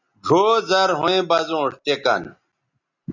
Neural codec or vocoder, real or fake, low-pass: none; real; 7.2 kHz